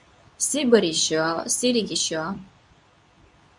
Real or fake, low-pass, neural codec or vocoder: fake; 10.8 kHz; codec, 24 kHz, 0.9 kbps, WavTokenizer, medium speech release version 2